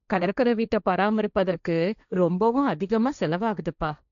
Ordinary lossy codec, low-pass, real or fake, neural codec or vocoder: none; 7.2 kHz; fake; codec, 16 kHz, 1.1 kbps, Voila-Tokenizer